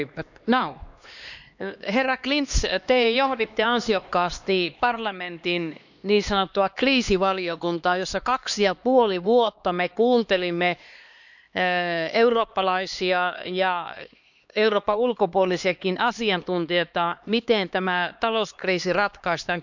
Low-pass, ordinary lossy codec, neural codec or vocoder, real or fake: 7.2 kHz; none; codec, 16 kHz, 2 kbps, X-Codec, HuBERT features, trained on LibriSpeech; fake